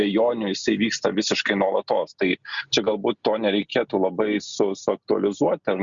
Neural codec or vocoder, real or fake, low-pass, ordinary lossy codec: none; real; 7.2 kHz; Opus, 64 kbps